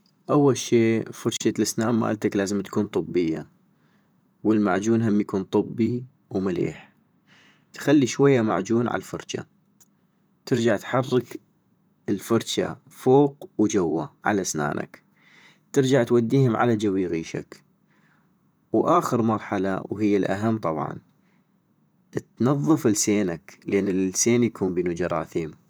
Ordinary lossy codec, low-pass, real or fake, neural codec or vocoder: none; none; fake; vocoder, 44.1 kHz, 128 mel bands, Pupu-Vocoder